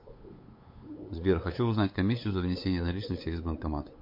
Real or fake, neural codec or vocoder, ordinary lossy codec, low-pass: fake; codec, 16 kHz, 8 kbps, FunCodec, trained on LibriTTS, 25 frames a second; MP3, 32 kbps; 5.4 kHz